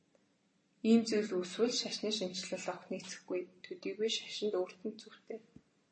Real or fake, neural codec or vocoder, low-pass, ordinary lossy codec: real; none; 9.9 kHz; MP3, 32 kbps